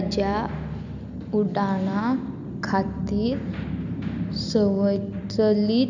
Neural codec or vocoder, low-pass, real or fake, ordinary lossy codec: none; 7.2 kHz; real; none